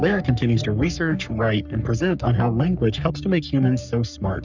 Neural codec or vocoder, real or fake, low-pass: codec, 44.1 kHz, 3.4 kbps, Pupu-Codec; fake; 7.2 kHz